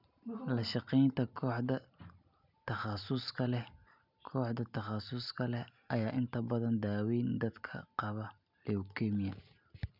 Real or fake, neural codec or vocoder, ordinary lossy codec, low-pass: real; none; none; 5.4 kHz